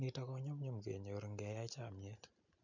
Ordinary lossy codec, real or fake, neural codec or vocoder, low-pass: none; real; none; 7.2 kHz